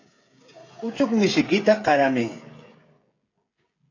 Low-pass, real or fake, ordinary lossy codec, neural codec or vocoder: 7.2 kHz; fake; AAC, 32 kbps; codec, 16 kHz, 16 kbps, FreqCodec, smaller model